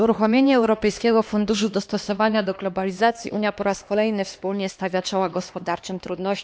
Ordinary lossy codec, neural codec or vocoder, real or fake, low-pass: none; codec, 16 kHz, 2 kbps, X-Codec, HuBERT features, trained on LibriSpeech; fake; none